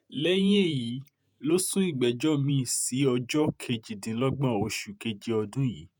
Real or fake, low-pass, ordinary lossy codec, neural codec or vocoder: fake; none; none; vocoder, 48 kHz, 128 mel bands, Vocos